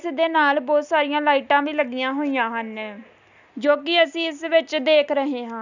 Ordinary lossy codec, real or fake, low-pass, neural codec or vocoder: none; real; 7.2 kHz; none